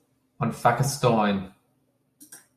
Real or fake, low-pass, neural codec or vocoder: real; 14.4 kHz; none